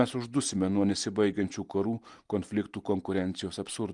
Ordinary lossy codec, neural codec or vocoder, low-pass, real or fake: Opus, 24 kbps; none; 10.8 kHz; real